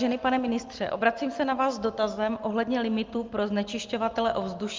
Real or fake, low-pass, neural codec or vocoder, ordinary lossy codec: real; 7.2 kHz; none; Opus, 32 kbps